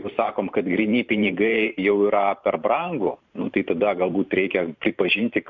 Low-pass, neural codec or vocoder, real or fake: 7.2 kHz; vocoder, 44.1 kHz, 128 mel bands every 512 samples, BigVGAN v2; fake